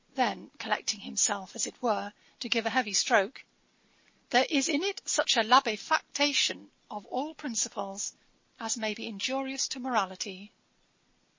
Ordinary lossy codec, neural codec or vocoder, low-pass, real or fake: MP3, 32 kbps; none; 7.2 kHz; real